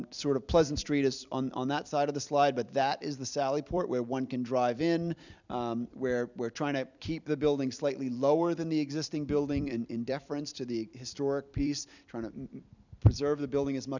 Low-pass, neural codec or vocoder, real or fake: 7.2 kHz; vocoder, 44.1 kHz, 128 mel bands every 256 samples, BigVGAN v2; fake